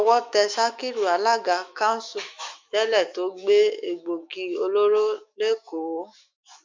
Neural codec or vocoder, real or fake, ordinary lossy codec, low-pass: autoencoder, 48 kHz, 128 numbers a frame, DAC-VAE, trained on Japanese speech; fake; MP3, 48 kbps; 7.2 kHz